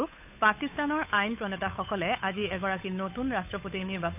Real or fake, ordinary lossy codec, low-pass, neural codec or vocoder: fake; none; 3.6 kHz; codec, 16 kHz, 8 kbps, FunCodec, trained on Chinese and English, 25 frames a second